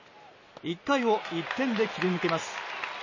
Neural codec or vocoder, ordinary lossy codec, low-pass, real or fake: none; MP3, 32 kbps; 7.2 kHz; real